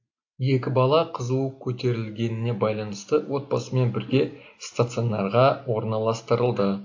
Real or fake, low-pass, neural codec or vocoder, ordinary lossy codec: real; 7.2 kHz; none; AAC, 48 kbps